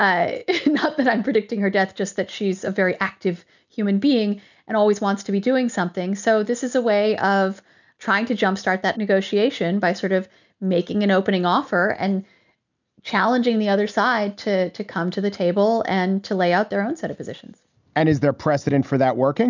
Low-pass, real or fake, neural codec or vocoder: 7.2 kHz; real; none